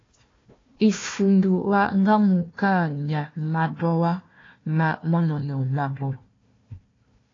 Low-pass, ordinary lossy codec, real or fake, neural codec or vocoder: 7.2 kHz; AAC, 32 kbps; fake; codec, 16 kHz, 1 kbps, FunCodec, trained on Chinese and English, 50 frames a second